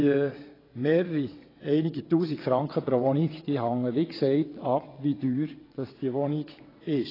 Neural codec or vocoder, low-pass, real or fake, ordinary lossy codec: vocoder, 22.05 kHz, 80 mel bands, WaveNeXt; 5.4 kHz; fake; AAC, 24 kbps